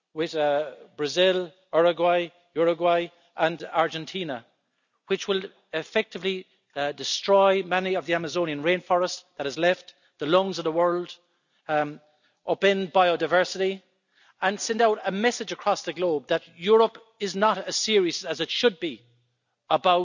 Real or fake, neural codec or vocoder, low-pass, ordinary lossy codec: real; none; 7.2 kHz; none